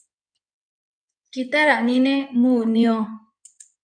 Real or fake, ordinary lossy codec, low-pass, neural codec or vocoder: fake; MP3, 64 kbps; 9.9 kHz; codec, 16 kHz in and 24 kHz out, 2.2 kbps, FireRedTTS-2 codec